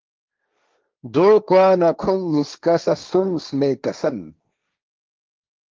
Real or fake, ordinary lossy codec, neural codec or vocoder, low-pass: fake; Opus, 32 kbps; codec, 16 kHz, 1.1 kbps, Voila-Tokenizer; 7.2 kHz